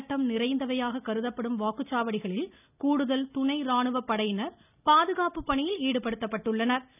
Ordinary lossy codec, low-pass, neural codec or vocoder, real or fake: none; 3.6 kHz; none; real